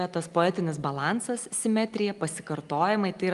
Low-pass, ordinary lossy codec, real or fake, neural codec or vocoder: 10.8 kHz; Opus, 32 kbps; real; none